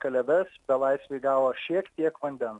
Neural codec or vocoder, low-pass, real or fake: none; 10.8 kHz; real